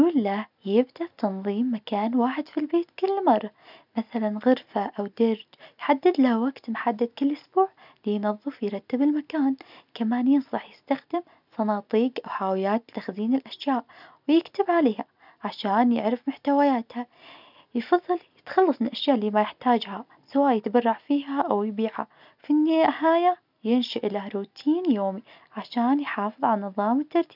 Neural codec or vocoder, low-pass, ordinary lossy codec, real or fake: none; 5.4 kHz; none; real